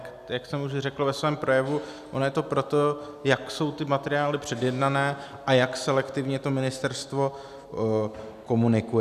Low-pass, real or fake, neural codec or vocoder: 14.4 kHz; real; none